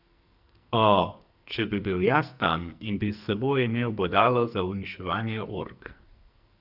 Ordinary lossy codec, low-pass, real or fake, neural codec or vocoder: none; 5.4 kHz; fake; codec, 44.1 kHz, 2.6 kbps, SNAC